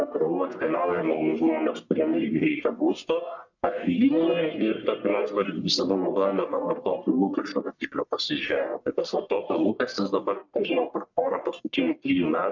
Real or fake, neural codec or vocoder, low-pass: fake; codec, 44.1 kHz, 1.7 kbps, Pupu-Codec; 7.2 kHz